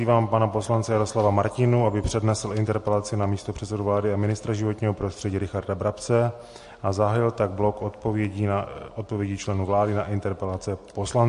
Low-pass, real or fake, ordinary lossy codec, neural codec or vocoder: 14.4 kHz; real; MP3, 48 kbps; none